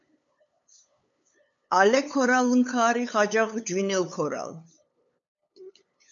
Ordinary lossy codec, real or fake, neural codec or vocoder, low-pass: AAC, 64 kbps; fake; codec, 16 kHz, 8 kbps, FunCodec, trained on LibriTTS, 25 frames a second; 7.2 kHz